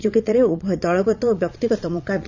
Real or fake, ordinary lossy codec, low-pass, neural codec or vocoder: fake; none; 7.2 kHz; vocoder, 22.05 kHz, 80 mel bands, Vocos